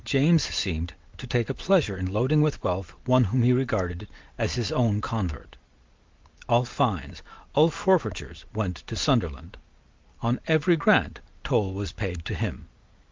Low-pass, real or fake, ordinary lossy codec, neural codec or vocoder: 7.2 kHz; real; Opus, 32 kbps; none